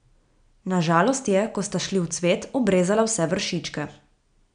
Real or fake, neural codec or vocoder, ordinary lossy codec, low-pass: real; none; none; 9.9 kHz